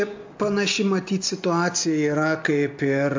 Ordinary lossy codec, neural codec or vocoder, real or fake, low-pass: MP3, 48 kbps; none; real; 7.2 kHz